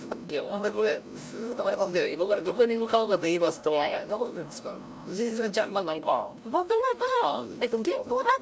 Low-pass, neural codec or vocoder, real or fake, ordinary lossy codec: none; codec, 16 kHz, 0.5 kbps, FreqCodec, larger model; fake; none